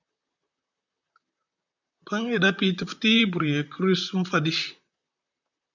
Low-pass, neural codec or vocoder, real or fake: 7.2 kHz; vocoder, 44.1 kHz, 128 mel bands, Pupu-Vocoder; fake